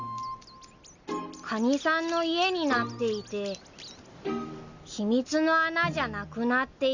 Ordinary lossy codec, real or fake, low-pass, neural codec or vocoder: Opus, 64 kbps; real; 7.2 kHz; none